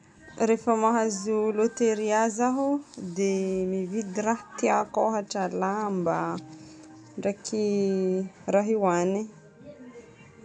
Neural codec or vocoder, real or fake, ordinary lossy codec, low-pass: none; real; none; 9.9 kHz